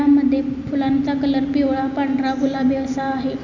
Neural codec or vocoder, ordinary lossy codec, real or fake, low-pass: none; none; real; 7.2 kHz